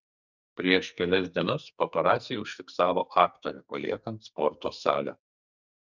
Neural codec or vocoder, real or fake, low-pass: codec, 44.1 kHz, 2.6 kbps, SNAC; fake; 7.2 kHz